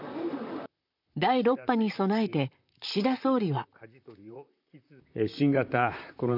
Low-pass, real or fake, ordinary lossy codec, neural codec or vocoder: 5.4 kHz; fake; none; vocoder, 44.1 kHz, 128 mel bands, Pupu-Vocoder